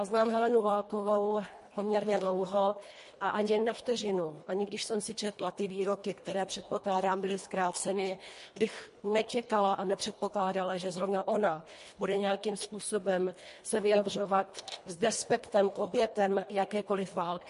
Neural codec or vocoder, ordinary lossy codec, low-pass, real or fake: codec, 24 kHz, 1.5 kbps, HILCodec; MP3, 48 kbps; 10.8 kHz; fake